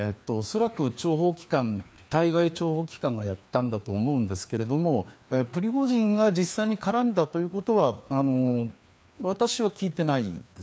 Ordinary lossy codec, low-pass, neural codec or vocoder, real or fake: none; none; codec, 16 kHz, 2 kbps, FreqCodec, larger model; fake